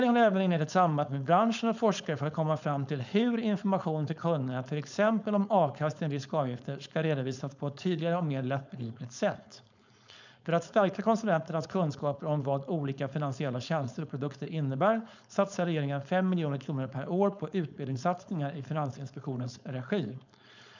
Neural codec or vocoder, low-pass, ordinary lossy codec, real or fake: codec, 16 kHz, 4.8 kbps, FACodec; 7.2 kHz; none; fake